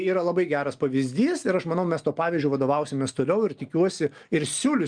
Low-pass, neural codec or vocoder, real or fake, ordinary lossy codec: 9.9 kHz; none; real; Opus, 32 kbps